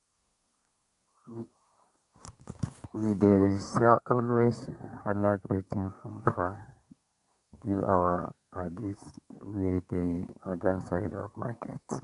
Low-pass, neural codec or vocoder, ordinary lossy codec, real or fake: 10.8 kHz; codec, 24 kHz, 1 kbps, SNAC; none; fake